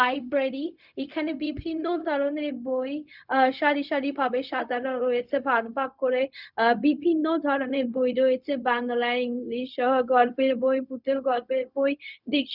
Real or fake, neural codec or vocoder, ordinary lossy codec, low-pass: fake; codec, 16 kHz, 0.4 kbps, LongCat-Audio-Codec; none; 5.4 kHz